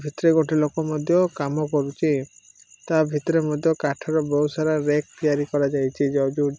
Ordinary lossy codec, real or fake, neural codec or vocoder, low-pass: none; real; none; none